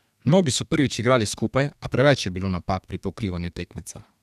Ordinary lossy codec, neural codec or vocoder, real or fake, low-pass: none; codec, 32 kHz, 1.9 kbps, SNAC; fake; 14.4 kHz